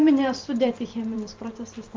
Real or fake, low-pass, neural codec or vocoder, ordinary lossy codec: fake; 7.2 kHz; vocoder, 44.1 kHz, 128 mel bands every 512 samples, BigVGAN v2; Opus, 32 kbps